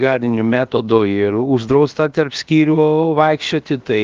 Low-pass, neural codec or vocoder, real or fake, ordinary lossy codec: 7.2 kHz; codec, 16 kHz, about 1 kbps, DyCAST, with the encoder's durations; fake; Opus, 32 kbps